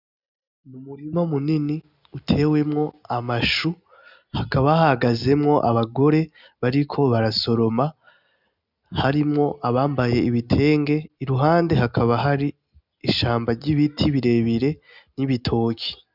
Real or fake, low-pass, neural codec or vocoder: real; 5.4 kHz; none